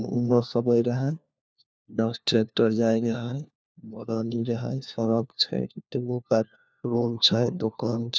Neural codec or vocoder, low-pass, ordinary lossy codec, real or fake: codec, 16 kHz, 1 kbps, FunCodec, trained on LibriTTS, 50 frames a second; none; none; fake